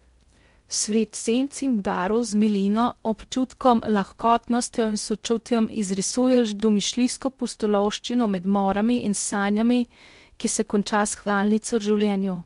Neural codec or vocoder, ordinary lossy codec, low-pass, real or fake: codec, 16 kHz in and 24 kHz out, 0.6 kbps, FocalCodec, streaming, 2048 codes; MP3, 64 kbps; 10.8 kHz; fake